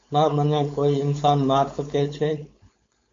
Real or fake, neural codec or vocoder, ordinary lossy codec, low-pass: fake; codec, 16 kHz, 4.8 kbps, FACodec; AAC, 48 kbps; 7.2 kHz